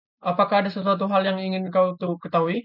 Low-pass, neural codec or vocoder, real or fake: 5.4 kHz; none; real